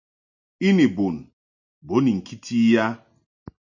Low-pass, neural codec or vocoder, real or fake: 7.2 kHz; none; real